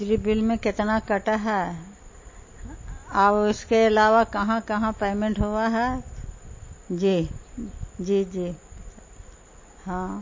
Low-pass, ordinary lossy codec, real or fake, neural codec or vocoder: 7.2 kHz; MP3, 32 kbps; real; none